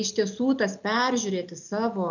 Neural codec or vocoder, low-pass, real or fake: none; 7.2 kHz; real